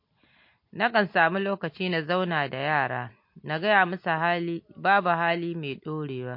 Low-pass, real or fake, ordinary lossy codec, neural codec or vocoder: 5.4 kHz; real; MP3, 32 kbps; none